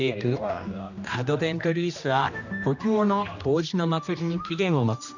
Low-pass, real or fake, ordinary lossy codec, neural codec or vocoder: 7.2 kHz; fake; none; codec, 16 kHz, 1 kbps, X-Codec, HuBERT features, trained on general audio